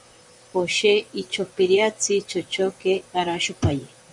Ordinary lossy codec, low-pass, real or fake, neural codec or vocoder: MP3, 96 kbps; 10.8 kHz; fake; vocoder, 44.1 kHz, 128 mel bands, Pupu-Vocoder